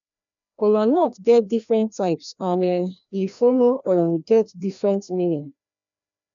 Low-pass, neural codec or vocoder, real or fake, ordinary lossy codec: 7.2 kHz; codec, 16 kHz, 1 kbps, FreqCodec, larger model; fake; none